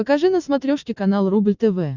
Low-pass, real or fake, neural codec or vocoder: 7.2 kHz; real; none